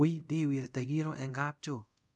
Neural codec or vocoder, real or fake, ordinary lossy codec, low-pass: codec, 24 kHz, 0.5 kbps, DualCodec; fake; none; none